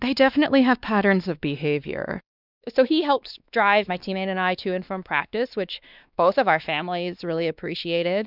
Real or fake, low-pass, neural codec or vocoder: fake; 5.4 kHz; codec, 16 kHz, 2 kbps, X-Codec, WavLM features, trained on Multilingual LibriSpeech